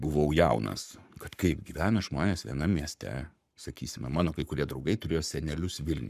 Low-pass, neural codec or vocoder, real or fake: 14.4 kHz; codec, 44.1 kHz, 7.8 kbps, Pupu-Codec; fake